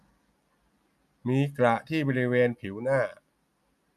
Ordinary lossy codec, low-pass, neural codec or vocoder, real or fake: none; 14.4 kHz; none; real